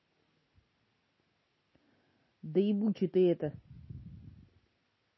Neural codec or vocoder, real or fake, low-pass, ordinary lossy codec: none; real; 7.2 kHz; MP3, 24 kbps